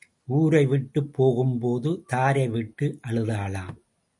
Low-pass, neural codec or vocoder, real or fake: 10.8 kHz; none; real